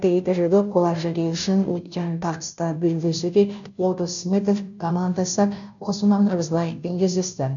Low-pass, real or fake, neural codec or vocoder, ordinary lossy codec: 7.2 kHz; fake; codec, 16 kHz, 0.5 kbps, FunCodec, trained on Chinese and English, 25 frames a second; none